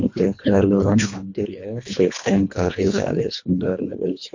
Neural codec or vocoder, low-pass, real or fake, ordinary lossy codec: codec, 24 kHz, 1.5 kbps, HILCodec; 7.2 kHz; fake; MP3, 48 kbps